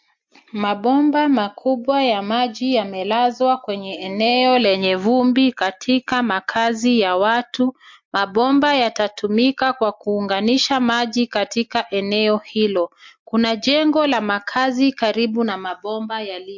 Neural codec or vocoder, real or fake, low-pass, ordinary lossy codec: none; real; 7.2 kHz; MP3, 48 kbps